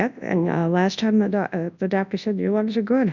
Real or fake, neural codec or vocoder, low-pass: fake; codec, 24 kHz, 0.9 kbps, WavTokenizer, large speech release; 7.2 kHz